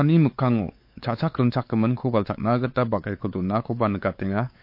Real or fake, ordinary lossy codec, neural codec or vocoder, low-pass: fake; MP3, 32 kbps; codec, 16 kHz, 4 kbps, X-Codec, WavLM features, trained on Multilingual LibriSpeech; 5.4 kHz